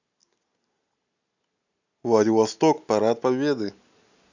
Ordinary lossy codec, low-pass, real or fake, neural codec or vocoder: none; 7.2 kHz; real; none